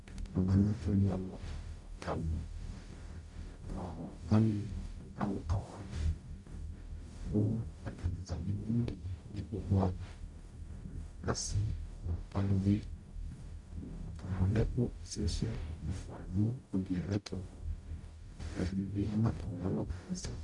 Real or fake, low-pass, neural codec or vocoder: fake; 10.8 kHz; codec, 44.1 kHz, 0.9 kbps, DAC